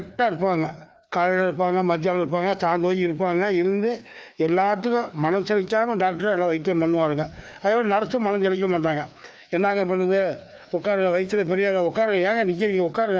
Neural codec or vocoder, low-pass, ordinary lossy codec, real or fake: codec, 16 kHz, 2 kbps, FreqCodec, larger model; none; none; fake